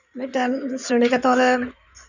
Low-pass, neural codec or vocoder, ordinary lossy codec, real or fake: 7.2 kHz; codec, 16 kHz in and 24 kHz out, 2.2 kbps, FireRedTTS-2 codec; none; fake